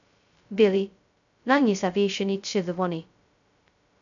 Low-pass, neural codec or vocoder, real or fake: 7.2 kHz; codec, 16 kHz, 0.2 kbps, FocalCodec; fake